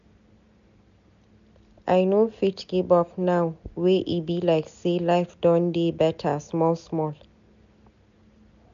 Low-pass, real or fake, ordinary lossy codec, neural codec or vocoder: 7.2 kHz; real; AAC, 96 kbps; none